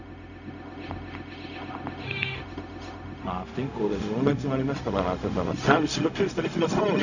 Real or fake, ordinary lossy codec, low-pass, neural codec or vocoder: fake; none; 7.2 kHz; codec, 16 kHz, 0.4 kbps, LongCat-Audio-Codec